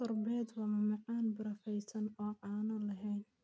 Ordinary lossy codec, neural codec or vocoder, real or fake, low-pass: none; none; real; none